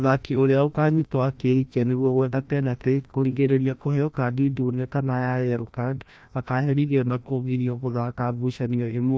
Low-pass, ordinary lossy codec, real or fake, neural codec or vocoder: none; none; fake; codec, 16 kHz, 1 kbps, FreqCodec, larger model